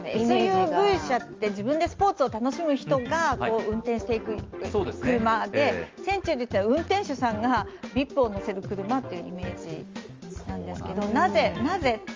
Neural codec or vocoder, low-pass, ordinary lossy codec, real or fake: none; 7.2 kHz; Opus, 32 kbps; real